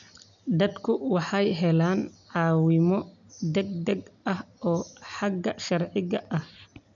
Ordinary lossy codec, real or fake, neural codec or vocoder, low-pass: none; real; none; 7.2 kHz